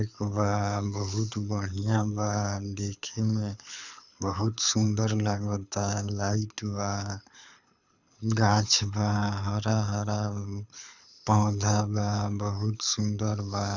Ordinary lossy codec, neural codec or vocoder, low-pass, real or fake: none; codec, 24 kHz, 6 kbps, HILCodec; 7.2 kHz; fake